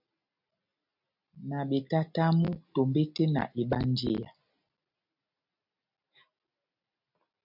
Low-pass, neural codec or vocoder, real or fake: 5.4 kHz; none; real